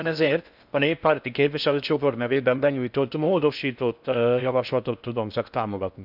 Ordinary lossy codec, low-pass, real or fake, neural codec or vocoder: none; 5.4 kHz; fake; codec, 16 kHz in and 24 kHz out, 0.6 kbps, FocalCodec, streaming, 4096 codes